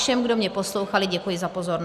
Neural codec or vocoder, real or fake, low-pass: none; real; 14.4 kHz